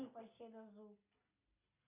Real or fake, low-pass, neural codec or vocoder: real; 3.6 kHz; none